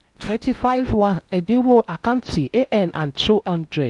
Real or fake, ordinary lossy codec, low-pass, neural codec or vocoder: fake; none; 10.8 kHz; codec, 16 kHz in and 24 kHz out, 0.8 kbps, FocalCodec, streaming, 65536 codes